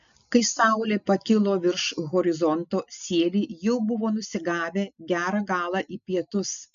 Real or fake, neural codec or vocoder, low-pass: real; none; 7.2 kHz